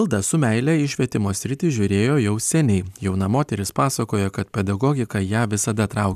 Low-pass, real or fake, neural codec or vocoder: 14.4 kHz; real; none